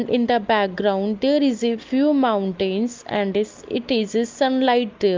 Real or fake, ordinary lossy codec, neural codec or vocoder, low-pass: real; Opus, 32 kbps; none; 7.2 kHz